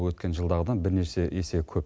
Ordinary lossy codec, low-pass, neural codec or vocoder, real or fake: none; none; none; real